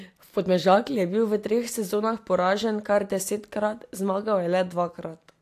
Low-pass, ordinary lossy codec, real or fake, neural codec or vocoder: 14.4 kHz; AAC, 64 kbps; real; none